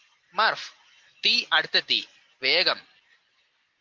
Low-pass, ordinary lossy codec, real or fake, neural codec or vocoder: 7.2 kHz; Opus, 16 kbps; real; none